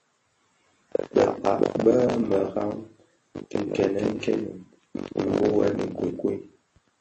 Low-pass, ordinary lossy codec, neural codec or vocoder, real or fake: 9.9 kHz; MP3, 32 kbps; none; real